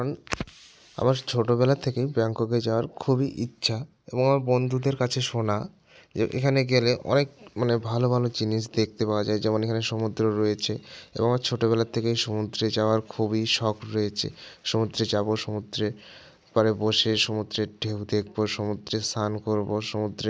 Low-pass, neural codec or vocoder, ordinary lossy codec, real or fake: none; none; none; real